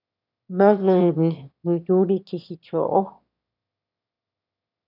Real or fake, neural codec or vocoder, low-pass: fake; autoencoder, 22.05 kHz, a latent of 192 numbers a frame, VITS, trained on one speaker; 5.4 kHz